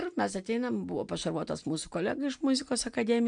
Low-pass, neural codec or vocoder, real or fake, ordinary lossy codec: 9.9 kHz; none; real; MP3, 96 kbps